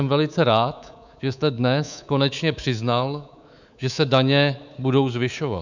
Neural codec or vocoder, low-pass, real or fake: codec, 24 kHz, 3.1 kbps, DualCodec; 7.2 kHz; fake